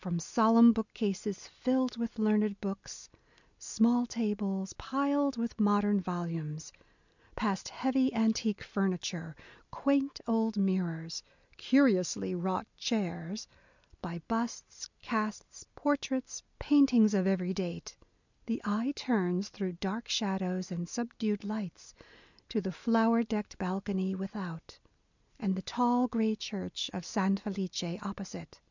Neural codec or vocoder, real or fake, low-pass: none; real; 7.2 kHz